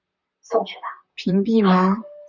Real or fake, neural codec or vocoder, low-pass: fake; vocoder, 44.1 kHz, 128 mel bands, Pupu-Vocoder; 7.2 kHz